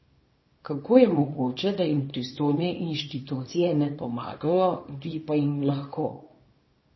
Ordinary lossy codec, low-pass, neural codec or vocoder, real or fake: MP3, 24 kbps; 7.2 kHz; codec, 24 kHz, 0.9 kbps, WavTokenizer, small release; fake